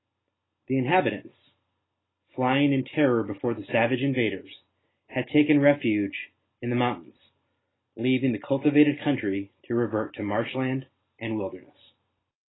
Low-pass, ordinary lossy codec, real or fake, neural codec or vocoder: 7.2 kHz; AAC, 16 kbps; real; none